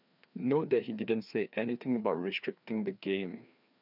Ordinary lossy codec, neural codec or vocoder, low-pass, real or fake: none; codec, 16 kHz, 2 kbps, FreqCodec, larger model; 5.4 kHz; fake